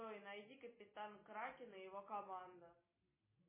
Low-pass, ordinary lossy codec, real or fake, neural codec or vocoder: 3.6 kHz; MP3, 16 kbps; real; none